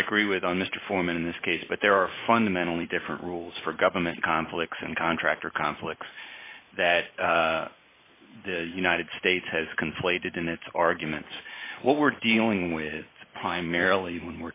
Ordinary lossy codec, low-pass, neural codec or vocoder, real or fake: AAC, 24 kbps; 3.6 kHz; none; real